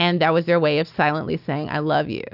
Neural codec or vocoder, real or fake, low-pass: none; real; 5.4 kHz